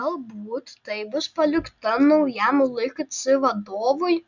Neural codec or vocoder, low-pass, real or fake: none; 7.2 kHz; real